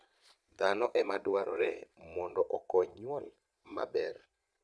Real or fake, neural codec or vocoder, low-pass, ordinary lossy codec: fake; vocoder, 22.05 kHz, 80 mel bands, Vocos; none; none